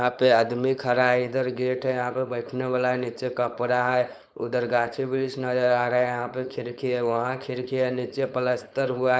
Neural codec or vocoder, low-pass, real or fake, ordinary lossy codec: codec, 16 kHz, 4.8 kbps, FACodec; none; fake; none